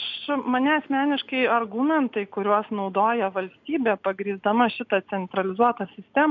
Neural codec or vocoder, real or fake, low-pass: none; real; 7.2 kHz